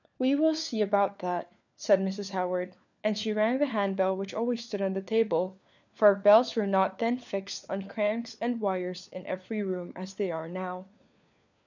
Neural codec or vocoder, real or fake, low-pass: codec, 16 kHz, 4 kbps, FunCodec, trained on LibriTTS, 50 frames a second; fake; 7.2 kHz